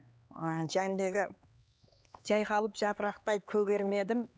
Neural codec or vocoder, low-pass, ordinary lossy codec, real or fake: codec, 16 kHz, 2 kbps, X-Codec, HuBERT features, trained on LibriSpeech; none; none; fake